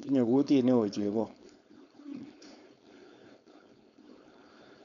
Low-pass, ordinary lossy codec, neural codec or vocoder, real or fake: 7.2 kHz; MP3, 96 kbps; codec, 16 kHz, 4.8 kbps, FACodec; fake